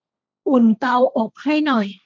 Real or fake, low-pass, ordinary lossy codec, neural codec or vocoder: fake; none; none; codec, 16 kHz, 1.1 kbps, Voila-Tokenizer